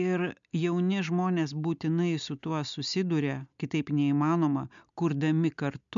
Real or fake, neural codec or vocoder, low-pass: real; none; 7.2 kHz